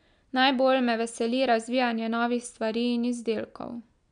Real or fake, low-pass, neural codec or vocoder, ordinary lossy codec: real; 9.9 kHz; none; none